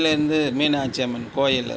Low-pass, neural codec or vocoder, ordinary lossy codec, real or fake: none; none; none; real